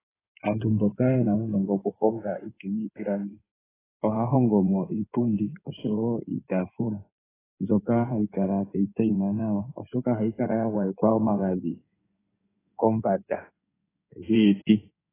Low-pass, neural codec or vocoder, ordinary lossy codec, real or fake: 3.6 kHz; codec, 16 kHz in and 24 kHz out, 2.2 kbps, FireRedTTS-2 codec; AAC, 16 kbps; fake